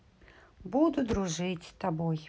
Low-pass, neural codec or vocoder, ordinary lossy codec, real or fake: none; none; none; real